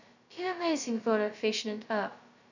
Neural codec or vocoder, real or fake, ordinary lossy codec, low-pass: codec, 16 kHz, 0.2 kbps, FocalCodec; fake; none; 7.2 kHz